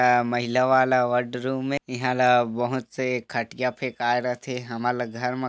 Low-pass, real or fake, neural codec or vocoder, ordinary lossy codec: none; real; none; none